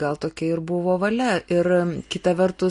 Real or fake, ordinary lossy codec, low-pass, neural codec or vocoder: real; MP3, 48 kbps; 10.8 kHz; none